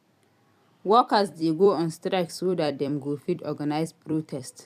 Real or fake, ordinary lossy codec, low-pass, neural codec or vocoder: fake; none; 14.4 kHz; vocoder, 44.1 kHz, 128 mel bands every 256 samples, BigVGAN v2